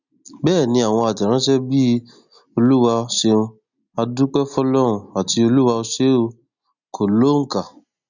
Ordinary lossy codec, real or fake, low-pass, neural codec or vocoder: none; real; 7.2 kHz; none